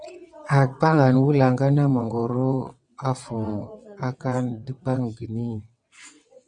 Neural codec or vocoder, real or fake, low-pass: vocoder, 22.05 kHz, 80 mel bands, WaveNeXt; fake; 9.9 kHz